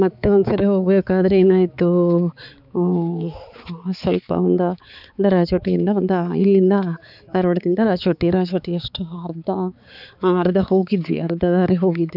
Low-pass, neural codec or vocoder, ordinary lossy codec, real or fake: 5.4 kHz; codec, 16 kHz, 4 kbps, X-Codec, HuBERT features, trained on balanced general audio; none; fake